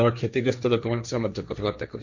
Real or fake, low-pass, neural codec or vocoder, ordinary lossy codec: fake; 7.2 kHz; codec, 16 kHz, 1.1 kbps, Voila-Tokenizer; none